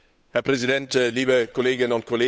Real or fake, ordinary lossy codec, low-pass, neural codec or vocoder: fake; none; none; codec, 16 kHz, 8 kbps, FunCodec, trained on Chinese and English, 25 frames a second